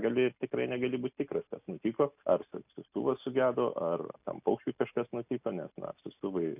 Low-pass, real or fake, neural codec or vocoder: 3.6 kHz; real; none